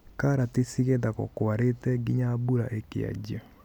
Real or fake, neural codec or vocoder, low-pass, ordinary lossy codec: real; none; 19.8 kHz; none